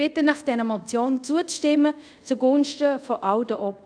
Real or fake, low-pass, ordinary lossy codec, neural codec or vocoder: fake; 9.9 kHz; none; codec, 24 kHz, 0.5 kbps, DualCodec